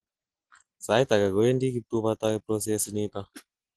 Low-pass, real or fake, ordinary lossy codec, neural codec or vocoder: 10.8 kHz; real; Opus, 16 kbps; none